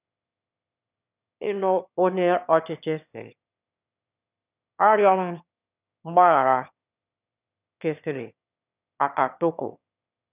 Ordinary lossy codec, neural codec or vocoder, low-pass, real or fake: none; autoencoder, 22.05 kHz, a latent of 192 numbers a frame, VITS, trained on one speaker; 3.6 kHz; fake